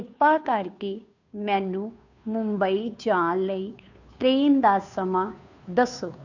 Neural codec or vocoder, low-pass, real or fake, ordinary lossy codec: codec, 16 kHz, 2 kbps, FunCodec, trained on Chinese and English, 25 frames a second; 7.2 kHz; fake; none